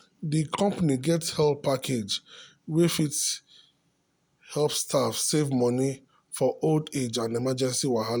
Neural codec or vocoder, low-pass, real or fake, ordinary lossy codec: none; none; real; none